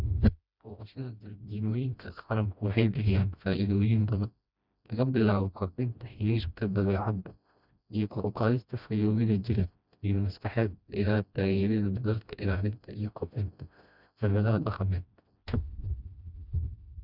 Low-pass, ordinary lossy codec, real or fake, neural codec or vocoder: 5.4 kHz; none; fake; codec, 16 kHz, 1 kbps, FreqCodec, smaller model